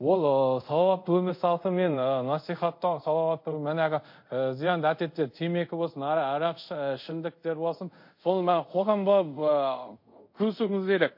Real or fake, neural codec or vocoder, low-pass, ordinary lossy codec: fake; codec, 24 kHz, 0.5 kbps, DualCodec; 5.4 kHz; MP3, 32 kbps